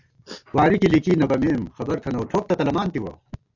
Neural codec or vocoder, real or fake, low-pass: none; real; 7.2 kHz